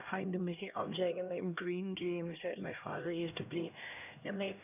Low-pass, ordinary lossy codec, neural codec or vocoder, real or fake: 3.6 kHz; none; codec, 16 kHz, 1 kbps, X-Codec, HuBERT features, trained on LibriSpeech; fake